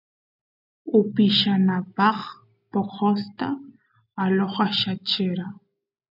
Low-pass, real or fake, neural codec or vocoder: 5.4 kHz; real; none